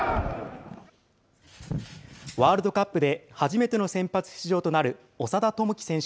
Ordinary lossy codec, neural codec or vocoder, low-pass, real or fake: none; none; none; real